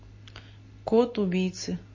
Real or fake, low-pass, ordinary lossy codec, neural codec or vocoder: real; 7.2 kHz; MP3, 32 kbps; none